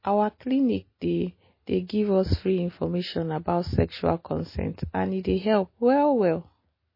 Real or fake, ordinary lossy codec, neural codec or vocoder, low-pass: real; MP3, 24 kbps; none; 5.4 kHz